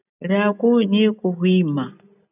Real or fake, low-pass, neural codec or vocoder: real; 3.6 kHz; none